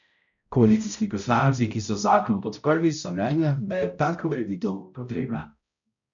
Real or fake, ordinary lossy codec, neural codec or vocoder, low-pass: fake; none; codec, 16 kHz, 0.5 kbps, X-Codec, HuBERT features, trained on balanced general audio; 7.2 kHz